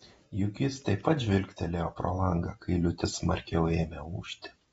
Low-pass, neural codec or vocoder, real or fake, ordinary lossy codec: 19.8 kHz; vocoder, 48 kHz, 128 mel bands, Vocos; fake; AAC, 24 kbps